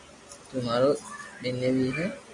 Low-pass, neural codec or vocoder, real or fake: 10.8 kHz; none; real